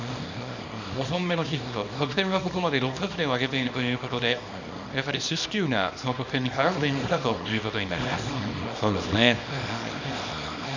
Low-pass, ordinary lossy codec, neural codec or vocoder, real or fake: 7.2 kHz; none; codec, 24 kHz, 0.9 kbps, WavTokenizer, small release; fake